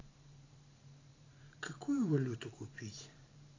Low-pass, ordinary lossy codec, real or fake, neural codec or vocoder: 7.2 kHz; AAC, 32 kbps; fake; vocoder, 44.1 kHz, 128 mel bands every 512 samples, BigVGAN v2